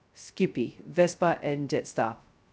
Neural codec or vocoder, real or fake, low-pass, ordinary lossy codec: codec, 16 kHz, 0.2 kbps, FocalCodec; fake; none; none